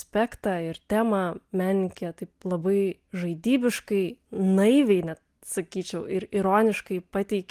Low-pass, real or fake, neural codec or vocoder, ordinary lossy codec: 14.4 kHz; real; none; Opus, 24 kbps